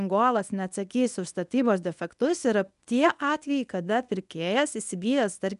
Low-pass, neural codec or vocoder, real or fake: 10.8 kHz; codec, 24 kHz, 0.9 kbps, WavTokenizer, medium speech release version 1; fake